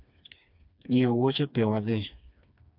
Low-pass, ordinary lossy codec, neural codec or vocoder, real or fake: 5.4 kHz; none; codec, 16 kHz, 2 kbps, FreqCodec, smaller model; fake